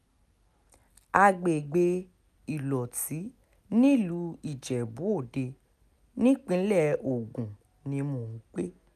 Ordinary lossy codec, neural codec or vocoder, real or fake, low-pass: none; none; real; 14.4 kHz